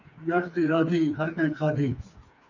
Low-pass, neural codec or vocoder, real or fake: 7.2 kHz; codec, 16 kHz, 4 kbps, FreqCodec, smaller model; fake